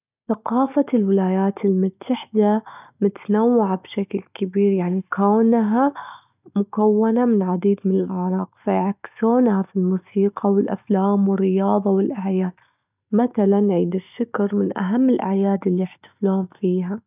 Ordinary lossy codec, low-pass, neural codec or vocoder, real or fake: none; 3.6 kHz; none; real